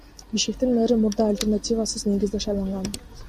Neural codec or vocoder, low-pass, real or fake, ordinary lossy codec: none; 14.4 kHz; real; MP3, 96 kbps